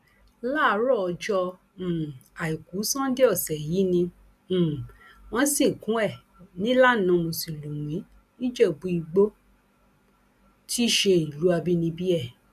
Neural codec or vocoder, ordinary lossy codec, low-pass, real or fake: none; none; 14.4 kHz; real